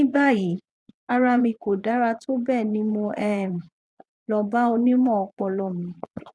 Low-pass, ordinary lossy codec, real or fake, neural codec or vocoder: none; none; fake; vocoder, 22.05 kHz, 80 mel bands, Vocos